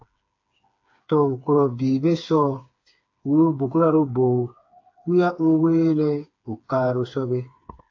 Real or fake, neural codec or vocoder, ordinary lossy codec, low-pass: fake; codec, 16 kHz, 4 kbps, FreqCodec, smaller model; AAC, 48 kbps; 7.2 kHz